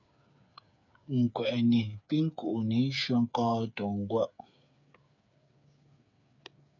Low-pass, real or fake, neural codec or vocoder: 7.2 kHz; fake; codec, 16 kHz, 8 kbps, FreqCodec, smaller model